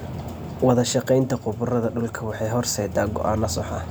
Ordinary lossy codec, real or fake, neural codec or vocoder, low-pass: none; real; none; none